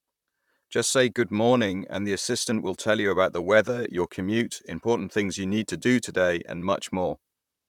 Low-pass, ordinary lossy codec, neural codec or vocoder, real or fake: 19.8 kHz; none; vocoder, 44.1 kHz, 128 mel bands, Pupu-Vocoder; fake